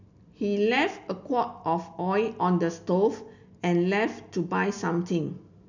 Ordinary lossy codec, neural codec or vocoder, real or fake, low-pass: none; none; real; 7.2 kHz